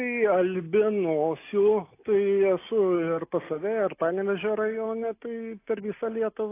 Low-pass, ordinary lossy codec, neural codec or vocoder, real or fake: 3.6 kHz; AAC, 24 kbps; none; real